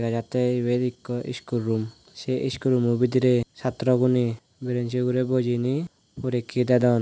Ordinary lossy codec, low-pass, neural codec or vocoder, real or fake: none; none; none; real